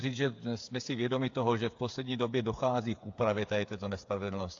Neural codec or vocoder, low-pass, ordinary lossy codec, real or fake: codec, 16 kHz, 8 kbps, FreqCodec, smaller model; 7.2 kHz; AAC, 48 kbps; fake